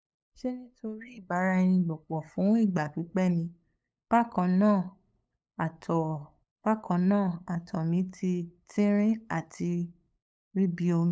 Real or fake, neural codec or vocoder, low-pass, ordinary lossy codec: fake; codec, 16 kHz, 8 kbps, FunCodec, trained on LibriTTS, 25 frames a second; none; none